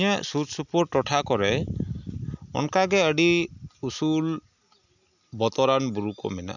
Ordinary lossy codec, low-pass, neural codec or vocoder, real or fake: none; 7.2 kHz; none; real